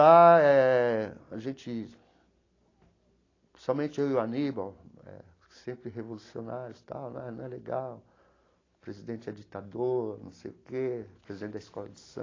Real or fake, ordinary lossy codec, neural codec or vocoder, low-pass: real; AAC, 32 kbps; none; 7.2 kHz